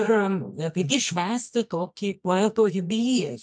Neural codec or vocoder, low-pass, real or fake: codec, 24 kHz, 0.9 kbps, WavTokenizer, medium music audio release; 9.9 kHz; fake